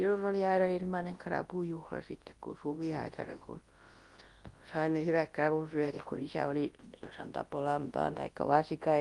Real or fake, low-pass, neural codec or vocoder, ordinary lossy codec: fake; 10.8 kHz; codec, 24 kHz, 0.9 kbps, WavTokenizer, large speech release; Opus, 24 kbps